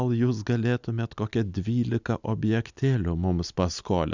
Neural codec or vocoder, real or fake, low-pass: none; real; 7.2 kHz